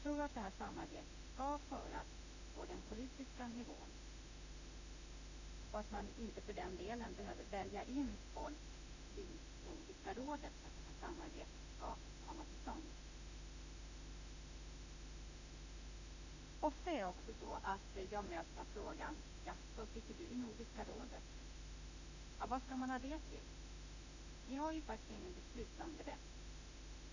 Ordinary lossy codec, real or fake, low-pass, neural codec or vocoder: none; fake; 7.2 kHz; autoencoder, 48 kHz, 32 numbers a frame, DAC-VAE, trained on Japanese speech